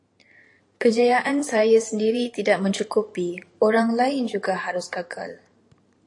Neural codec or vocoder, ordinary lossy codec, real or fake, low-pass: vocoder, 44.1 kHz, 128 mel bands every 512 samples, BigVGAN v2; AAC, 48 kbps; fake; 10.8 kHz